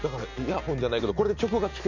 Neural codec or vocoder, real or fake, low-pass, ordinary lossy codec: none; real; 7.2 kHz; none